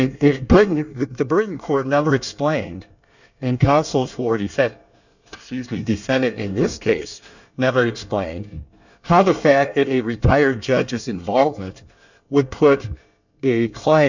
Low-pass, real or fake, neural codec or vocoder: 7.2 kHz; fake; codec, 24 kHz, 1 kbps, SNAC